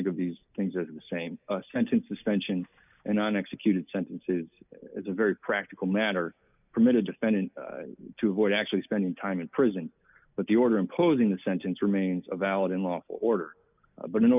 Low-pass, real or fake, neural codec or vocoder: 3.6 kHz; real; none